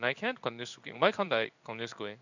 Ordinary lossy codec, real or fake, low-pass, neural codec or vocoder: none; fake; 7.2 kHz; codec, 16 kHz in and 24 kHz out, 1 kbps, XY-Tokenizer